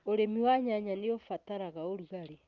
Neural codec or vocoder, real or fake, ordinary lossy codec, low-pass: none; real; Opus, 32 kbps; 7.2 kHz